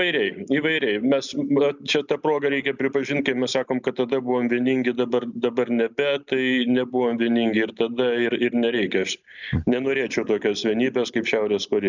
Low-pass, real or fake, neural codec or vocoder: 7.2 kHz; real; none